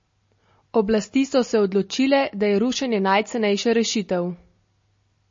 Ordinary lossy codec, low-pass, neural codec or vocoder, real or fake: MP3, 32 kbps; 7.2 kHz; none; real